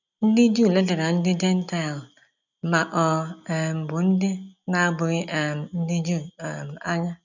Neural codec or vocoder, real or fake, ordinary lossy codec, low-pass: none; real; none; 7.2 kHz